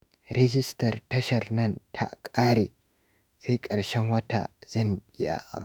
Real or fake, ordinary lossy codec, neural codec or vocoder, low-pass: fake; none; autoencoder, 48 kHz, 32 numbers a frame, DAC-VAE, trained on Japanese speech; none